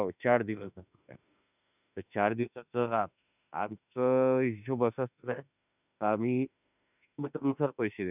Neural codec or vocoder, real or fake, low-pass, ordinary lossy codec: autoencoder, 48 kHz, 32 numbers a frame, DAC-VAE, trained on Japanese speech; fake; 3.6 kHz; none